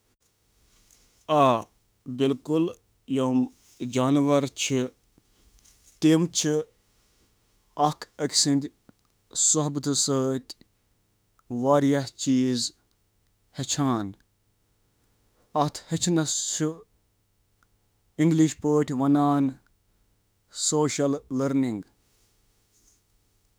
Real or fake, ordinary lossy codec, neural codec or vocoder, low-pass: fake; none; autoencoder, 48 kHz, 32 numbers a frame, DAC-VAE, trained on Japanese speech; none